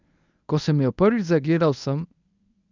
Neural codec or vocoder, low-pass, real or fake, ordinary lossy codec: codec, 24 kHz, 0.9 kbps, WavTokenizer, medium speech release version 1; 7.2 kHz; fake; none